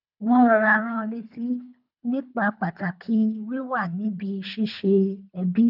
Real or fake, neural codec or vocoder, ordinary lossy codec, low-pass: fake; codec, 24 kHz, 3 kbps, HILCodec; none; 5.4 kHz